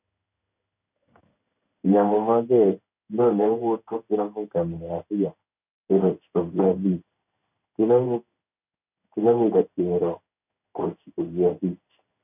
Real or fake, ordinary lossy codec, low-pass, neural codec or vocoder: fake; none; 3.6 kHz; codec, 16 kHz in and 24 kHz out, 1 kbps, XY-Tokenizer